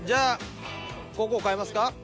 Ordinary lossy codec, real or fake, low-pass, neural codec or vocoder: none; real; none; none